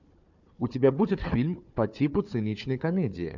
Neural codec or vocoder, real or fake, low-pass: codec, 16 kHz, 4 kbps, FunCodec, trained on Chinese and English, 50 frames a second; fake; 7.2 kHz